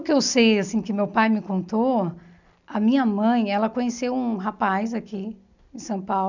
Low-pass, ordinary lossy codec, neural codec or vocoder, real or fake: 7.2 kHz; none; none; real